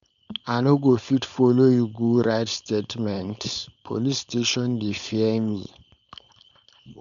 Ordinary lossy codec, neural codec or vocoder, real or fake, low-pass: none; codec, 16 kHz, 4.8 kbps, FACodec; fake; 7.2 kHz